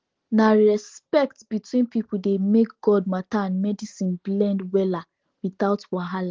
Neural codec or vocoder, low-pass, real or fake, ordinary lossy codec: none; 7.2 kHz; real; Opus, 16 kbps